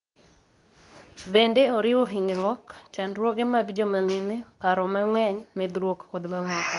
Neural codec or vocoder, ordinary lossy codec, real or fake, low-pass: codec, 24 kHz, 0.9 kbps, WavTokenizer, medium speech release version 2; MP3, 96 kbps; fake; 10.8 kHz